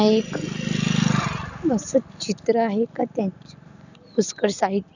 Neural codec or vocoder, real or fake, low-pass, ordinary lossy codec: none; real; 7.2 kHz; none